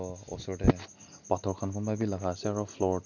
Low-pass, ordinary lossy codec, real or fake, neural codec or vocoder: 7.2 kHz; none; real; none